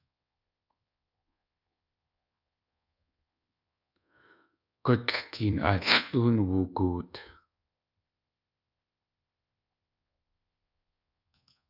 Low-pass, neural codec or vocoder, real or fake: 5.4 kHz; codec, 24 kHz, 1.2 kbps, DualCodec; fake